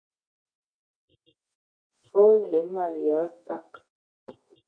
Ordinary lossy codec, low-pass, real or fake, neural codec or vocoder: MP3, 64 kbps; 9.9 kHz; fake; codec, 24 kHz, 0.9 kbps, WavTokenizer, medium music audio release